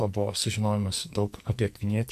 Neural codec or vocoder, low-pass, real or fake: codec, 44.1 kHz, 2.6 kbps, SNAC; 14.4 kHz; fake